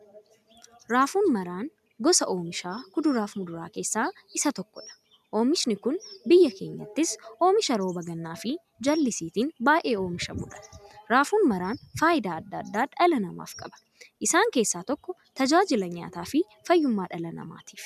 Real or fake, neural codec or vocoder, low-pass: real; none; 14.4 kHz